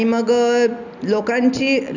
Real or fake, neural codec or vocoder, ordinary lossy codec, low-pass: real; none; none; 7.2 kHz